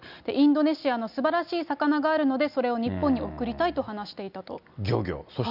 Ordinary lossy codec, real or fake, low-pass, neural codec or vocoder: none; real; 5.4 kHz; none